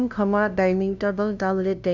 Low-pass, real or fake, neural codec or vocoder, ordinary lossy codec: 7.2 kHz; fake; codec, 16 kHz, 0.5 kbps, FunCodec, trained on LibriTTS, 25 frames a second; none